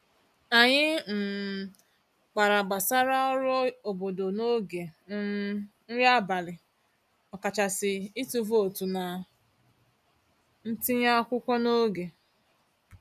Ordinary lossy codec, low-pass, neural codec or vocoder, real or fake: none; 14.4 kHz; none; real